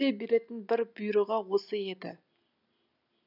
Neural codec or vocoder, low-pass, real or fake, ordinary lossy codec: none; 5.4 kHz; real; none